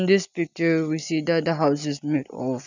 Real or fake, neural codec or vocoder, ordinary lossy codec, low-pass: real; none; none; 7.2 kHz